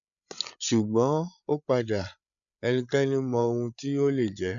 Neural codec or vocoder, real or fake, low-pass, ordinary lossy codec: codec, 16 kHz, 8 kbps, FreqCodec, larger model; fake; 7.2 kHz; none